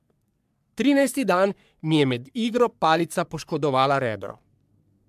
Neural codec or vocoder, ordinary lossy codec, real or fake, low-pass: codec, 44.1 kHz, 3.4 kbps, Pupu-Codec; MP3, 96 kbps; fake; 14.4 kHz